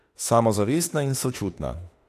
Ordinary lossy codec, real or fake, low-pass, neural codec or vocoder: AAC, 64 kbps; fake; 14.4 kHz; autoencoder, 48 kHz, 32 numbers a frame, DAC-VAE, trained on Japanese speech